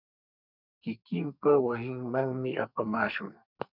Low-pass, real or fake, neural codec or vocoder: 5.4 kHz; fake; codec, 44.1 kHz, 2.6 kbps, SNAC